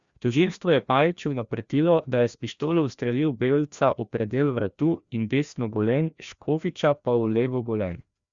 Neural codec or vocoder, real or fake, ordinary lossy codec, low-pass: codec, 16 kHz, 1 kbps, FreqCodec, larger model; fake; Opus, 64 kbps; 7.2 kHz